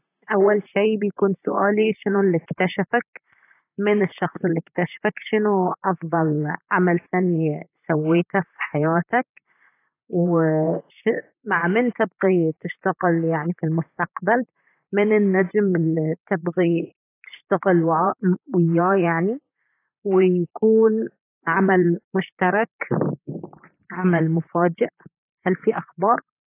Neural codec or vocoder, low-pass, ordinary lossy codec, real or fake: vocoder, 44.1 kHz, 128 mel bands, Pupu-Vocoder; 3.6 kHz; AAC, 24 kbps; fake